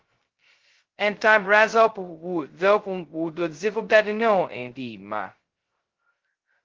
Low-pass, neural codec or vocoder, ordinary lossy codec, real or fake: 7.2 kHz; codec, 16 kHz, 0.2 kbps, FocalCodec; Opus, 16 kbps; fake